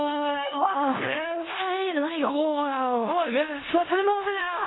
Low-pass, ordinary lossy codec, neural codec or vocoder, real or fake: 7.2 kHz; AAC, 16 kbps; codec, 16 kHz in and 24 kHz out, 0.4 kbps, LongCat-Audio-Codec, four codebook decoder; fake